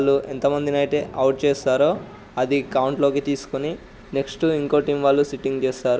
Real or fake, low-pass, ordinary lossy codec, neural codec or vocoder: real; none; none; none